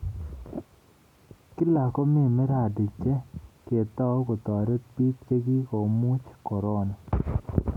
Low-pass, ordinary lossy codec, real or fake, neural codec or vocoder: 19.8 kHz; none; real; none